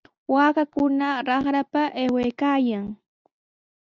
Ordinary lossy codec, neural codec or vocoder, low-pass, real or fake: Opus, 64 kbps; none; 7.2 kHz; real